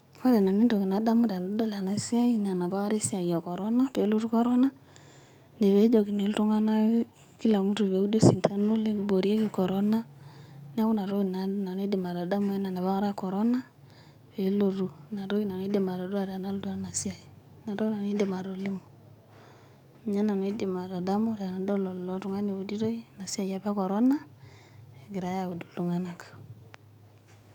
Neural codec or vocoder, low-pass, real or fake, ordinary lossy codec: codec, 44.1 kHz, 7.8 kbps, DAC; 19.8 kHz; fake; none